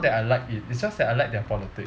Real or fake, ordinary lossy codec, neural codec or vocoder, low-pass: real; none; none; none